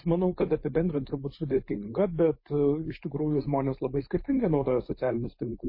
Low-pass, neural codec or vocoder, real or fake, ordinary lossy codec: 5.4 kHz; codec, 16 kHz, 4.8 kbps, FACodec; fake; MP3, 24 kbps